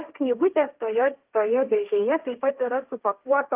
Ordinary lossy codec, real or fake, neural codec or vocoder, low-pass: Opus, 32 kbps; fake; codec, 16 kHz, 1.1 kbps, Voila-Tokenizer; 3.6 kHz